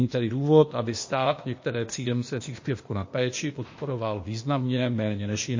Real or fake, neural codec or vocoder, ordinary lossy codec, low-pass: fake; codec, 16 kHz, 0.8 kbps, ZipCodec; MP3, 32 kbps; 7.2 kHz